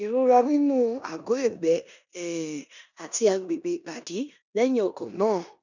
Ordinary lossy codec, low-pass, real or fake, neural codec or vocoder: none; 7.2 kHz; fake; codec, 16 kHz in and 24 kHz out, 0.9 kbps, LongCat-Audio-Codec, four codebook decoder